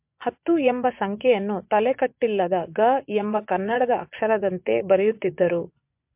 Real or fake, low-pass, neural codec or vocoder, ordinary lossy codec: fake; 3.6 kHz; vocoder, 24 kHz, 100 mel bands, Vocos; MP3, 32 kbps